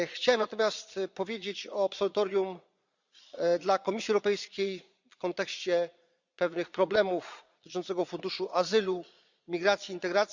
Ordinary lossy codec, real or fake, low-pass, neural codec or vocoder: Opus, 64 kbps; fake; 7.2 kHz; vocoder, 22.05 kHz, 80 mel bands, Vocos